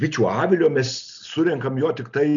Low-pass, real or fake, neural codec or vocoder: 7.2 kHz; real; none